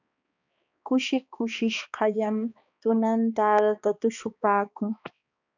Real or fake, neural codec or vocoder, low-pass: fake; codec, 16 kHz, 2 kbps, X-Codec, HuBERT features, trained on balanced general audio; 7.2 kHz